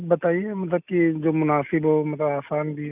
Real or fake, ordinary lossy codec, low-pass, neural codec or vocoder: real; none; 3.6 kHz; none